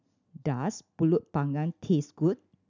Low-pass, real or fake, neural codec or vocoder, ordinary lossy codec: 7.2 kHz; real; none; none